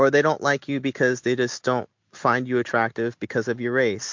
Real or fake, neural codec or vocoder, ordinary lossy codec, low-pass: real; none; MP3, 48 kbps; 7.2 kHz